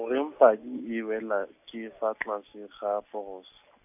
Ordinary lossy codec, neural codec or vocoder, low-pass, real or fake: none; none; 3.6 kHz; real